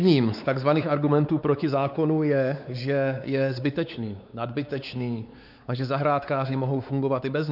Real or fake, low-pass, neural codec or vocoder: fake; 5.4 kHz; codec, 16 kHz, 4 kbps, X-Codec, WavLM features, trained on Multilingual LibriSpeech